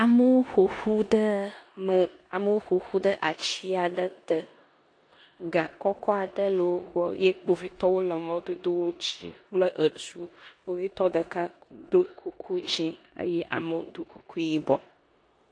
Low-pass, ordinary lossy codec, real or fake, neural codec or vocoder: 9.9 kHz; AAC, 48 kbps; fake; codec, 16 kHz in and 24 kHz out, 0.9 kbps, LongCat-Audio-Codec, four codebook decoder